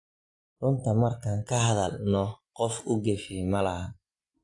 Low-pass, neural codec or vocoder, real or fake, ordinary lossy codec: 10.8 kHz; none; real; AAC, 48 kbps